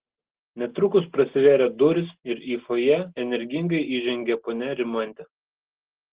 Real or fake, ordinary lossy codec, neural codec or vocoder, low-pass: real; Opus, 16 kbps; none; 3.6 kHz